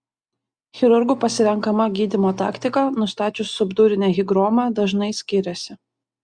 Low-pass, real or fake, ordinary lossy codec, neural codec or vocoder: 9.9 kHz; real; Opus, 64 kbps; none